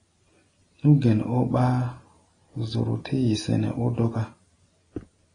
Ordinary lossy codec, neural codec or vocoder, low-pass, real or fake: AAC, 32 kbps; none; 9.9 kHz; real